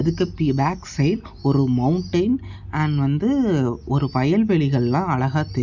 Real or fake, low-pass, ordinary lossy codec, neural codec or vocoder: real; 7.2 kHz; none; none